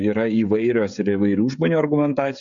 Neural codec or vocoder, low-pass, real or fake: codec, 16 kHz, 16 kbps, FreqCodec, smaller model; 7.2 kHz; fake